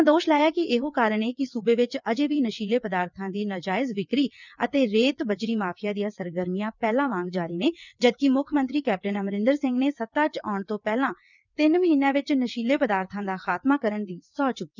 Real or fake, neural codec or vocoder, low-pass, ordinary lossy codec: fake; vocoder, 22.05 kHz, 80 mel bands, WaveNeXt; 7.2 kHz; none